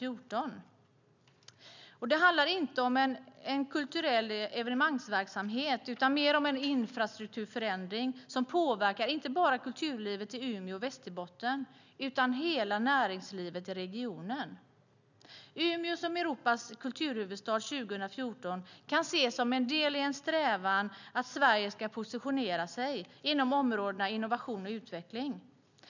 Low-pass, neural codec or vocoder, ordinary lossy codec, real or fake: 7.2 kHz; none; none; real